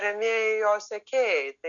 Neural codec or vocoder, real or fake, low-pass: none; real; 7.2 kHz